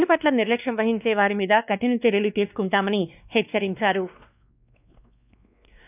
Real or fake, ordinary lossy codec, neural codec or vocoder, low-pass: fake; none; codec, 16 kHz, 2 kbps, X-Codec, WavLM features, trained on Multilingual LibriSpeech; 3.6 kHz